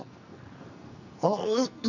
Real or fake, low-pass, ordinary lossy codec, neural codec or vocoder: fake; 7.2 kHz; none; codec, 44.1 kHz, 2.6 kbps, SNAC